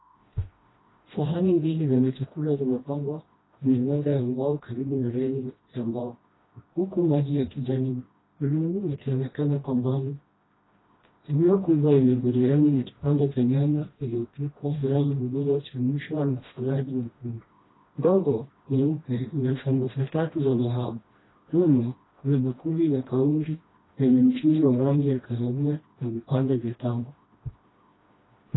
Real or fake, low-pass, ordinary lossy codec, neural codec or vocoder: fake; 7.2 kHz; AAC, 16 kbps; codec, 16 kHz, 1 kbps, FreqCodec, smaller model